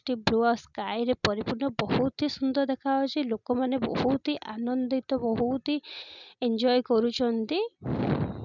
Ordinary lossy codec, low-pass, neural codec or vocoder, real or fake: none; 7.2 kHz; none; real